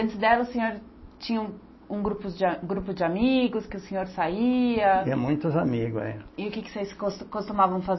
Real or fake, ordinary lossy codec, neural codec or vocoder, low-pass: real; MP3, 24 kbps; none; 7.2 kHz